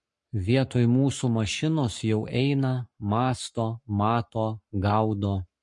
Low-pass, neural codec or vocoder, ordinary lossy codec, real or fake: 10.8 kHz; codec, 44.1 kHz, 7.8 kbps, Pupu-Codec; MP3, 48 kbps; fake